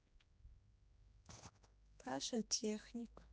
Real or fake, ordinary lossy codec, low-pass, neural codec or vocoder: fake; none; none; codec, 16 kHz, 2 kbps, X-Codec, HuBERT features, trained on general audio